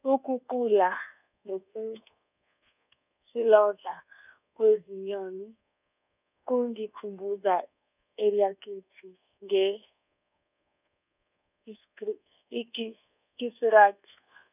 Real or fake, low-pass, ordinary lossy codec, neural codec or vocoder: fake; 3.6 kHz; none; codec, 24 kHz, 1.2 kbps, DualCodec